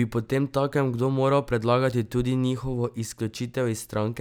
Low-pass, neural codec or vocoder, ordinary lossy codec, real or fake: none; none; none; real